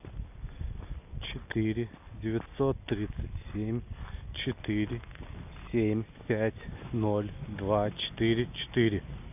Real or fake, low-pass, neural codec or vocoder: real; 3.6 kHz; none